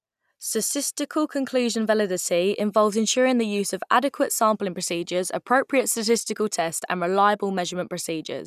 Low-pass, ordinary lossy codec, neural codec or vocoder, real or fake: 14.4 kHz; none; none; real